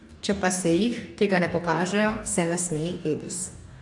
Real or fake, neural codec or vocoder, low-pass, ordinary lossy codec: fake; codec, 44.1 kHz, 2.6 kbps, DAC; 10.8 kHz; AAC, 64 kbps